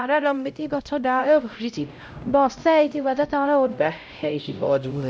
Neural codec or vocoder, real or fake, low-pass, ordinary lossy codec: codec, 16 kHz, 0.5 kbps, X-Codec, HuBERT features, trained on LibriSpeech; fake; none; none